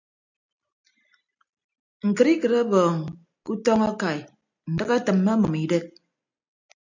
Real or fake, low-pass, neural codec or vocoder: real; 7.2 kHz; none